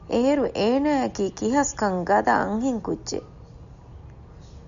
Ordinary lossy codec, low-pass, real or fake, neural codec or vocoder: MP3, 96 kbps; 7.2 kHz; real; none